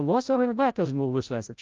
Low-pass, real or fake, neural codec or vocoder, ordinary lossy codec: 7.2 kHz; fake; codec, 16 kHz, 0.5 kbps, FreqCodec, larger model; Opus, 24 kbps